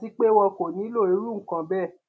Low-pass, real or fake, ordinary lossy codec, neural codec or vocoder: none; real; none; none